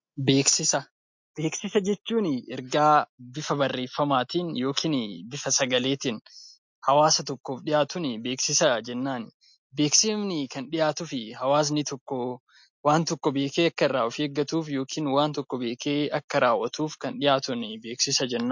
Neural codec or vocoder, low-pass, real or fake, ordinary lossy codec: none; 7.2 kHz; real; MP3, 48 kbps